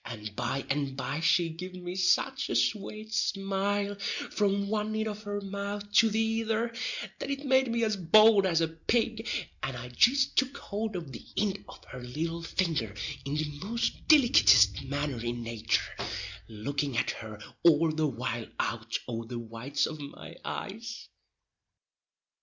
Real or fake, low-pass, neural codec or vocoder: real; 7.2 kHz; none